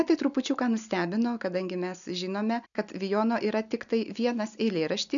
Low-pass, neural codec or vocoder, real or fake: 7.2 kHz; none; real